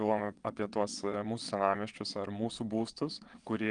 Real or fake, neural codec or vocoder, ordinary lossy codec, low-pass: fake; vocoder, 22.05 kHz, 80 mel bands, WaveNeXt; Opus, 32 kbps; 9.9 kHz